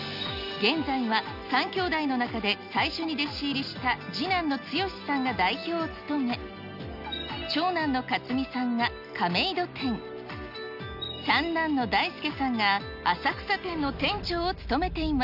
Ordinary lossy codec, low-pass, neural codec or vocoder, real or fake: none; 5.4 kHz; none; real